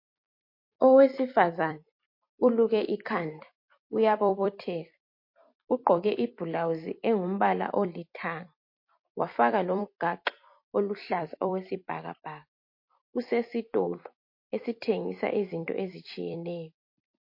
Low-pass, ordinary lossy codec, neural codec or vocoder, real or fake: 5.4 kHz; MP3, 32 kbps; vocoder, 44.1 kHz, 128 mel bands every 256 samples, BigVGAN v2; fake